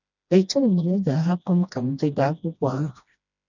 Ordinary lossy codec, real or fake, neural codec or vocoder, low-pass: none; fake; codec, 16 kHz, 1 kbps, FreqCodec, smaller model; 7.2 kHz